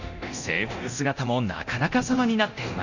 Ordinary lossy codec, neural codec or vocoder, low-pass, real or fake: none; codec, 24 kHz, 0.9 kbps, DualCodec; 7.2 kHz; fake